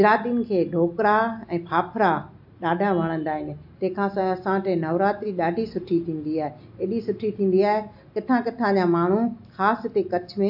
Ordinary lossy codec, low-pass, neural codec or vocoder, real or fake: AAC, 48 kbps; 5.4 kHz; none; real